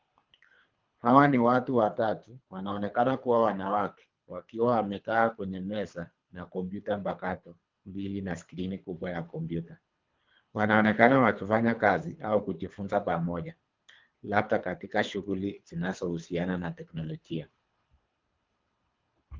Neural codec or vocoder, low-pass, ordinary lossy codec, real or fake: codec, 24 kHz, 3 kbps, HILCodec; 7.2 kHz; Opus, 24 kbps; fake